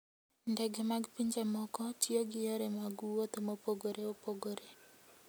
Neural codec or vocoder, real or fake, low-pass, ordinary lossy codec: none; real; none; none